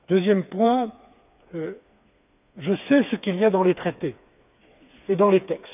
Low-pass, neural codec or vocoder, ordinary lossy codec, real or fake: 3.6 kHz; codec, 16 kHz, 4 kbps, FreqCodec, smaller model; none; fake